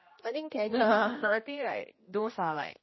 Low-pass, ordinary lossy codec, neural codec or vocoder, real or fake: 7.2 kHz; MP3, 24 kbps; codec, 16 kHz, 1 kbps, X-Codec, HuBERT features, trained on general audio; fake